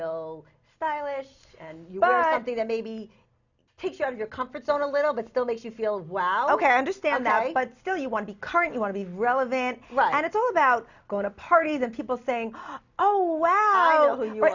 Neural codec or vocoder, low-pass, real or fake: none; 7.2 kHz; real